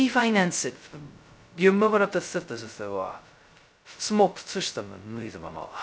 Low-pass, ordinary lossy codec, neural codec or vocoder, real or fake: none; none; codec, 16 kHz, 0.2 kbps, FocalCodec; fake